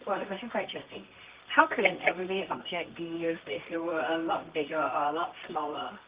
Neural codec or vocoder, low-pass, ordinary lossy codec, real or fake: codec, 24 kHz, 0.9 kbps, WavTokenizer, medium music audio release; 3.6 kHz; Opus, 32 kbps; fake